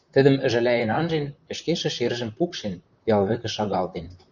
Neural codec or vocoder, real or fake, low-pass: vocoder, 44.1 kHz, 128 mel bands, Pupu-Vocoder; fake; 7.2 kHz